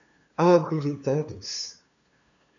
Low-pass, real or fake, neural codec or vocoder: 7.2 kHz; fake; codec, 16 kHz, 2 kbps, FunCodec, trained on LibriTTS, 25 frames a second